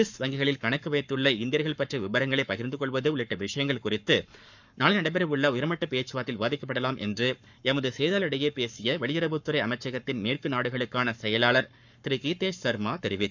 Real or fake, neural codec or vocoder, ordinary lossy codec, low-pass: fake; codec, 44.1 kHz, 7.8 kbps, Pupu-Codec; none; 7.2 kHz